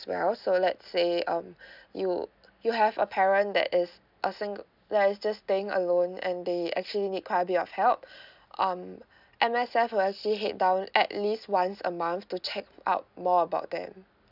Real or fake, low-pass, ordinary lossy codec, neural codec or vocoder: real; 5.4 kHz; none; none